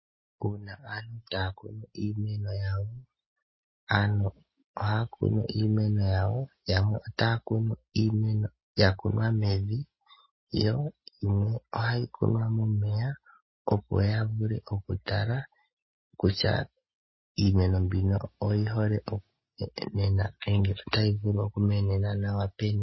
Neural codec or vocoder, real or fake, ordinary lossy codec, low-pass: none; real; MP3, 24 kbps; 7.2 kHz